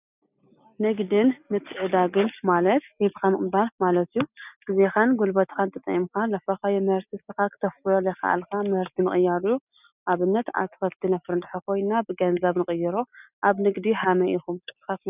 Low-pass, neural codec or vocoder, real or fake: 3.6 kHz; none; real